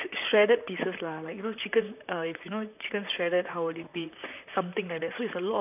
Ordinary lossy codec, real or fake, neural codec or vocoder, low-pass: none; fake; vocoder, 44.1 kHz, 128 mel bands, Pupu-Vocoder; 3.6 kHz